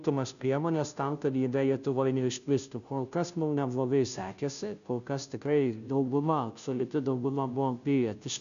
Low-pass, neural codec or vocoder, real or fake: 7.2 kHz; codec, 16 kHz, 0.5 kbps, FunCodec, trained on Chinese and English, 25 frames a second; fake